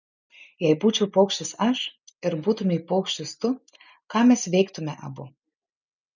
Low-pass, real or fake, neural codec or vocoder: 7.2 kHz; real; none